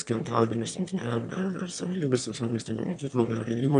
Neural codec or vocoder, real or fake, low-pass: autoencoder, 22.05 kHz, a latent of 192 numbers a frame, VITS, trained on one speaker; fake; 9.9 kHz